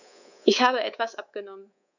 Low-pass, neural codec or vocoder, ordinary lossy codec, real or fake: 7.2 kHz; codec, 24 kHz, 3.1 kbps, DualCodec; none; fake